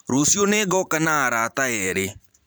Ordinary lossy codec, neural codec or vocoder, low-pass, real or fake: none; vocoder, 44.1 kHz, 128 mel bands every 512 samples, BigVGAN v2; none; fake